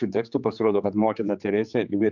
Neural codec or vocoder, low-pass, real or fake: codec, 16 kHz, 4 kbps, X-Codec, HuBERT features, trained on general audio; 7.2 kHz; fake